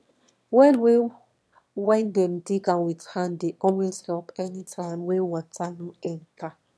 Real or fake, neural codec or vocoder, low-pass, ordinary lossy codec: fake; autoencoder, 22.05 kHz, a latent of 192 numbers a frame, VITS, trained on one speaker; none; none